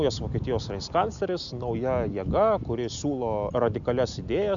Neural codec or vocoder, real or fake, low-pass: none; real; 7.2 kHz